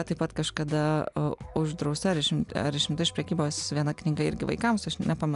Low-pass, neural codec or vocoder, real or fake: 10.8 kHz; none; real